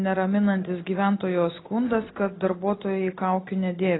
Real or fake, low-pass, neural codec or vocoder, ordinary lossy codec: real; 7.2 kHz; none; AAC, 16 kbps